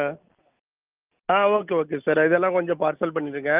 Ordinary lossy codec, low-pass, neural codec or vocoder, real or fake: Opus, 24 kbps; 3.6 kHz; none; real